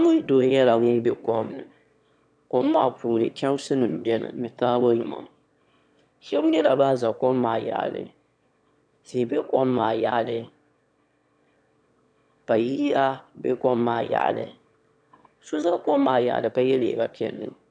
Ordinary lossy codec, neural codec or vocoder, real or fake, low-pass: MP3, 96 kbps; autoencoder, 22.05 kHz, a latent of 192 numbers a frame, VITS, trained on one speaker; fake; 9.9 kHz